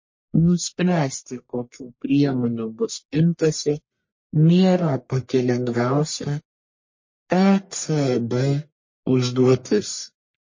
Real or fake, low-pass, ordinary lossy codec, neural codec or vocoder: fake; 7.2 kHz; MP3, 32 kbps; codec, 44.1 kHz, 1.7 kbps, Pupu-Codec